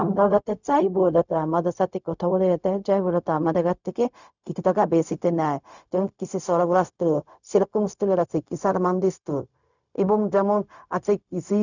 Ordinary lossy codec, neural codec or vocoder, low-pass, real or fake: none; codec, 16 kHz, 0.4 kbps, LongCat-Audio-Codec; 7.2 kHz; fake